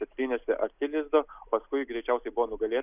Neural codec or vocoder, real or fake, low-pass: none; real; 3.6 kHz